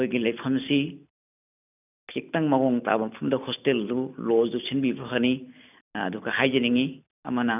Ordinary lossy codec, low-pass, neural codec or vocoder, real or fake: none; 3.6 kHz; none; real